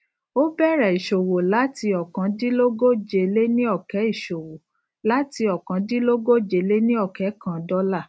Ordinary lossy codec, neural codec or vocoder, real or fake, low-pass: none; none; real; none